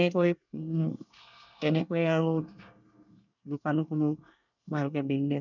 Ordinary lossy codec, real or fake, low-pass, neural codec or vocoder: none; fake; 7.2 kHz; codec, 24 kHz, 1 kbps, SNAC